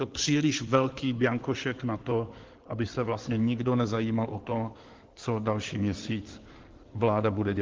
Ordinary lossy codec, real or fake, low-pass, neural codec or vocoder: Opus, 16 kbps; fake; 7.2 kHz; codec, 16 kHz in and 24 kHz out, 2.2 kbps, FireRedTTS-2 codec